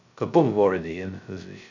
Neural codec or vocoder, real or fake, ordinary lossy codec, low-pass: codec, 16 kHz, 0.2 kbps, FocalCodec; fake; none; 7.2 kHz